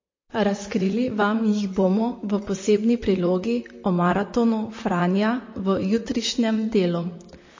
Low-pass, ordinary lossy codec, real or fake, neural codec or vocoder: 7.2 kHz; MP3, 32 kbps; fake; vocoder, 44.1 kHz, 128 mel bands, Pupu-Vocoder